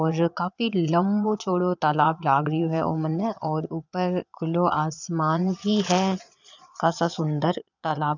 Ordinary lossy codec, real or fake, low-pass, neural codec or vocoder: none; fake; 7.2 kHz; vocoder, 22.05 kHz, 80 mel bands, Vocos